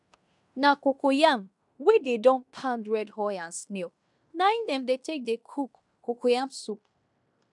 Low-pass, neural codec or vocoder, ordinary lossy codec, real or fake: 10.8 kHz; codec, 16 kHz in and 24 kHz out, 0.9 kbps, LongCat-Audio-Codec, fine tuned four codebook decoder; MP3, 96 kbps; fake